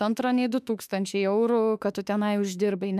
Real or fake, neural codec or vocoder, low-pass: fake; autoencoder, 48 kHz, 32 numbers a frame, DAC-VAE, trained on Japanese speech; 14.4 kHz